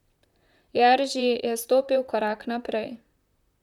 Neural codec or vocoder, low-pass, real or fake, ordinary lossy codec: vocoder, 44.1 kHz, 128 mel bands, Pupu-Vocoder; 19.8 kHz; fake; none